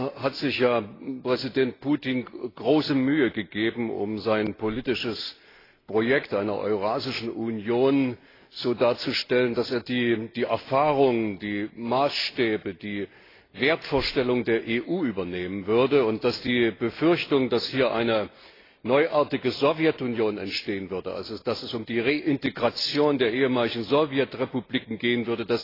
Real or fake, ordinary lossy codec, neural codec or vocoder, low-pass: real; AAC, 24 kbps; none; 5.4 kHz